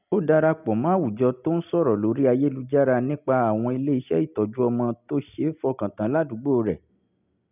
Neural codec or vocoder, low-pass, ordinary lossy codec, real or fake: none; 3.6 kHz; none; real